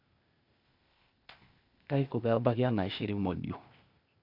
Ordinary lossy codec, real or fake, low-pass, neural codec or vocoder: MP3, 48 kbps; fake; 5.4 kHz; codec, 16 kHz, 0.8 kbps, ZipCodec